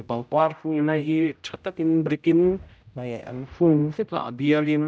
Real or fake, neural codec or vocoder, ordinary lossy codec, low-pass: fake; codec, 16 kHz, 0.5 kbps, X-Codec, HuBERT features, trained on general audio; none; none